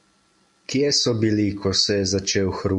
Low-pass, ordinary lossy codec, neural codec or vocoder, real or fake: 10.8 kHz; MP3, 64 kbps; none; real